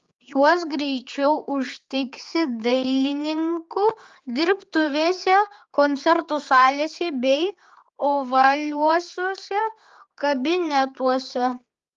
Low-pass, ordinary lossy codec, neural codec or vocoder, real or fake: 7.2 kHz; Opus, 32 kbps; codec, 16 kHz, 4 kbps, X-Codec, HuBERT features, trained on general audio; fake